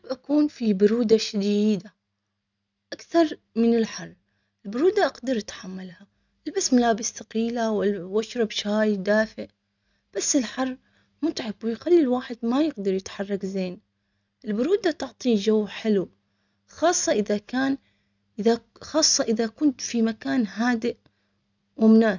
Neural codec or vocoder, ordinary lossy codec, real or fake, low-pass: none; none; real; 7.2 kHz